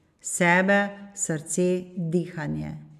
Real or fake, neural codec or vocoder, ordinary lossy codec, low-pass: real; none; none; 14.4 kHz